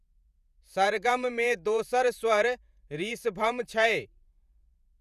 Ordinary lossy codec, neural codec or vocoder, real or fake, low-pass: none; vocoder, 48 kHz, 128 mel bands, Vocos; fake; 14.4 kHz